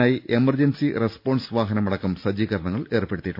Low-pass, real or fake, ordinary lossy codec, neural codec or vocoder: 5.4 kHz; real; none; none